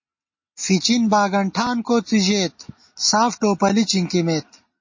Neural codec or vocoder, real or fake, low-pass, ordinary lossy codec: none; real; 7.2 kHz; MP3, 32 kbps